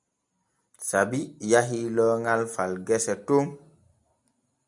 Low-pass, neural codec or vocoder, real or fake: 10.8 kHz; none; real